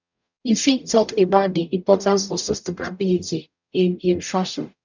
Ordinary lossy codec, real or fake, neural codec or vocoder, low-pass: none; fake; codec, 44.1 kHz, 0.9 kbps, DAC; 7.2 kHz